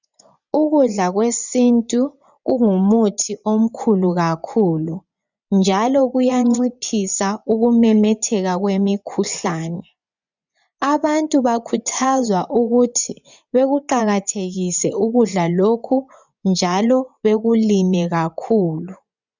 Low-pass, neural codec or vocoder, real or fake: 7.2 kHz; vocoder, 24 kHz, 100 mel bands, Vocos; fake